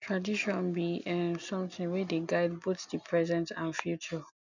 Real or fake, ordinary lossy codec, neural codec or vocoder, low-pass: real; none; none; 7.2 kHz